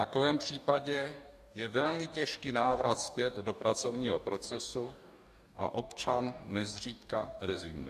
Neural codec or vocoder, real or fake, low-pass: codec, 44.1 kHz, 2.6 kbps, DAC; fake; 14.4 kHz